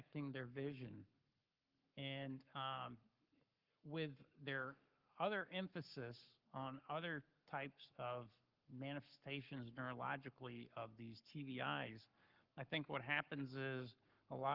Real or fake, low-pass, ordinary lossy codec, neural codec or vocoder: fake; 5.4 kHz; Opus, 32 kbps; codec, 44.1 kHz, 7.8 kbps, Pupu-Codec